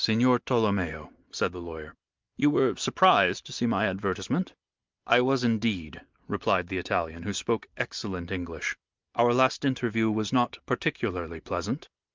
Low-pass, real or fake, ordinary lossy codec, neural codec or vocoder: 7.2 kHz; real; Opus, 24 kbps; none